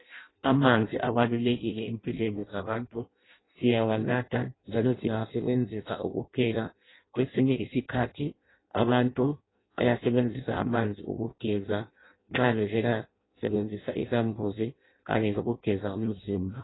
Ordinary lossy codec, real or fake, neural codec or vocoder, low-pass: AAC, 16 kbps; fake; codec, 16 kHz in and 24 kHz out, 0.6 kbps, FireRedTTS-2 codec; 7.2 kHz